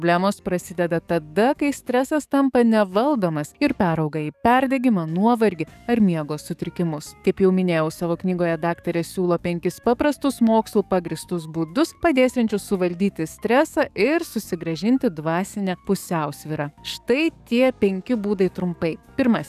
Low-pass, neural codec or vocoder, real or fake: 14.4 kHz; codec, 44.1 kHz, 7.8 kbps, DAC; fake